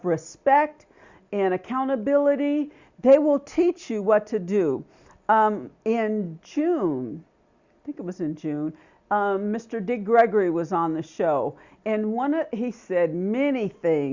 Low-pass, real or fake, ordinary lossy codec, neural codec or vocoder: 7.2 kHz; real; Opus, 64 kbps; none